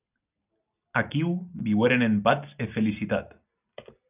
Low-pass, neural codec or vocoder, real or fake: 3.6 kHz; none; real